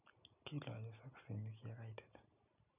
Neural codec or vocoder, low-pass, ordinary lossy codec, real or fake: none; 3.6 kHz; none; real